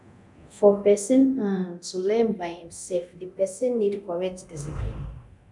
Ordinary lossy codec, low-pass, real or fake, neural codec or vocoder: none; 10.8 kHz; fake; codec, 24 kHz, 0.9 kbps, DualCodec